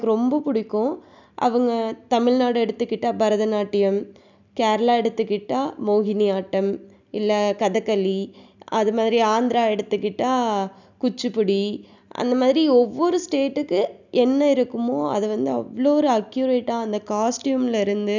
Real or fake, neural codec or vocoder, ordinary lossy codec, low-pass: real; none; none; 7.2 kHz